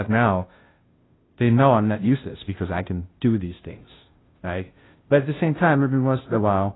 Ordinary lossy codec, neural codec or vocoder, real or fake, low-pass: AAC, 16 kbps; codec, 16 kHz, 0.5 kbps, FunCodec, trained on Chinese and English, 25 frames a second; fake; 7.2 kHz